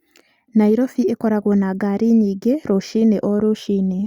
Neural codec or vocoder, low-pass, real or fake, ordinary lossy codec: none; 19.8 kHz; real; Opus, 64 kbps